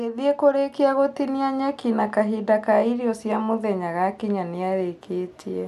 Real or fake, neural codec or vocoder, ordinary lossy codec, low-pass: real; none; none; 14.4 kHz